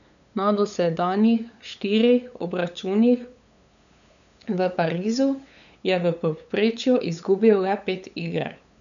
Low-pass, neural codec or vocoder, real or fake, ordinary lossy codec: 7.2 kHz; codec, 16 kHz, 8 kbps, FunCodec, trained on LibriTTS, 25 frames a second; fake; none